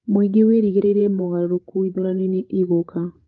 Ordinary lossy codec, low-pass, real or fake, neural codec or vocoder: Opus, 32 kbps; 7.2 kHz; fake; codec, 16 kHz, 16 kbps, FreqCodec, larger model